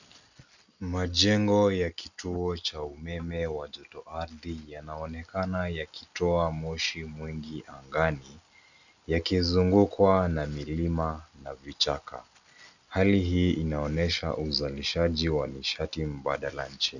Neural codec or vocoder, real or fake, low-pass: none; real; 7.2 kHz